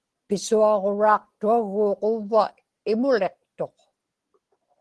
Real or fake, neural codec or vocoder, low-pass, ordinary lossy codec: real; none; 10.8 kHz; Opus, 16 kbps